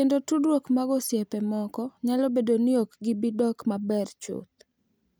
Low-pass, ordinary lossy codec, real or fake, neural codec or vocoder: none; none; real; none